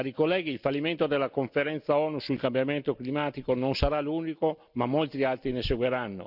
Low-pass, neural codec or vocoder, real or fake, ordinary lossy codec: 5.4 kHz; none; real; none